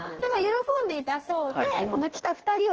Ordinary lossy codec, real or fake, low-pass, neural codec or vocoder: Opus, 16 kbps; fake; 7.2 kHz; codec, 16 kHz in and 24 kHz out, 1.1 kbps, FireRedTTS-2 codec